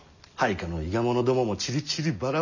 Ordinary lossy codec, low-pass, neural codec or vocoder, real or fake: none; 7.2 kHz; none; real